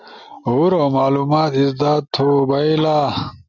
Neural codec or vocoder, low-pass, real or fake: none; 7.2 kHz; real